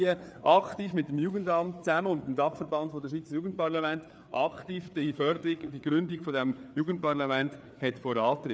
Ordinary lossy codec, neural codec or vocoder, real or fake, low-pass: none; codec, 16 kHz, 4 kbps, FreqCodec, larger model; fake; none